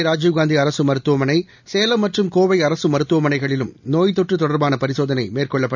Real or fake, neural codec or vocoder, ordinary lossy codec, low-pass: real; none; none; 7.2 kHz